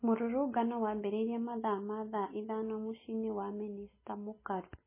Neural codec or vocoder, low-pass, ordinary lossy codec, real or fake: none; 3.6 kHz; MP3, 16 kbps; real